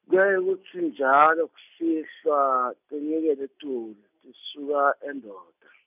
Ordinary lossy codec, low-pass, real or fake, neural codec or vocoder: none; 3.6 kHz; real; none